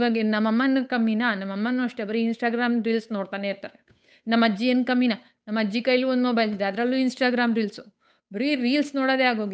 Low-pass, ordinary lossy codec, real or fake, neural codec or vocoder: none; none; fake; codec, 16 kHz, 8 kbps, FunCodec, trained on Chinese and English, 25 frames a second